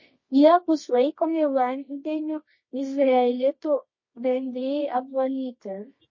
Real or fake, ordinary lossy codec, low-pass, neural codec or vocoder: fake; MP3, 32 kbps; 7.2 kHz; codec, 24 kHz, 0.9 kbps, WavTokenizer, medium music audio release